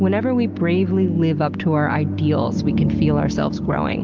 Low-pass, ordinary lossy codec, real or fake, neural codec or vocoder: 7.2 kHz; Opus, 32 kbps; real; none